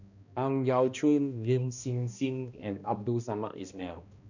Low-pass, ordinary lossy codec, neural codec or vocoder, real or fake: 7.2 kHz; none; codec, 16 kHz, 1 kbps, X-Codec, HuBERT features, trained on balanced general audio; fake